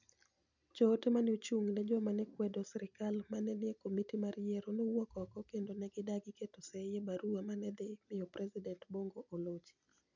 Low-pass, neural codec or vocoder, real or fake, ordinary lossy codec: 7.2 kHz; none; real; none